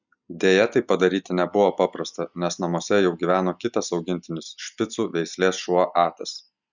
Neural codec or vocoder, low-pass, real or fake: none; 7.2 kHz; real